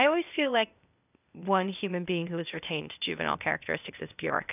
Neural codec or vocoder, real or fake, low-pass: codec, 16 kHz, 0.8 kbps, ZipCodec; fake; 3.6 kHz